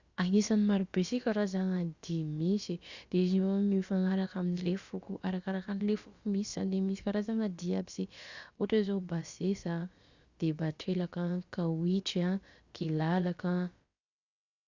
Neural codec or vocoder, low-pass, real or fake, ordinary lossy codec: codec, 16 kHz, about 1 kbps, DyCAST, with the encoder's durations; 7.2 kHz; fake; Opus, 64 kbps